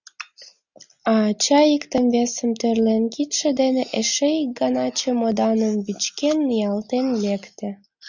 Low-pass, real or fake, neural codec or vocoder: 7.2 kHz; real; none